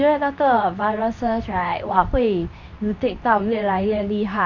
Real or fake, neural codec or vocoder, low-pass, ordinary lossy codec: fake; codec, 24 kHz, 0.9 kbps, WavTokenizer, medium speech release version 2; 7.2 kHz; none